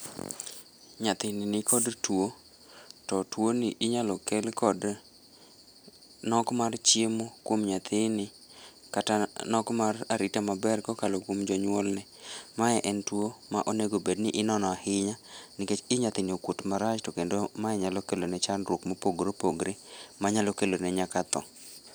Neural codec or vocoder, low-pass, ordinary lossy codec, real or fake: none; none; none; real